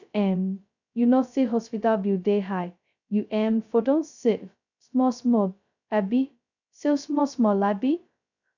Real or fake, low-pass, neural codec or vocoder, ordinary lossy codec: fake; 7.2 kHz; codec, 16 kHz, 0.2 kbps, FocalCodec; none